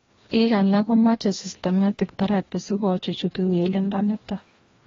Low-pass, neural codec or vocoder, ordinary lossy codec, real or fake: 7.2 kHz; codec, 16 kHz, 1 kbps, FreqCodec, larger model; AAC, 24 kbps; fake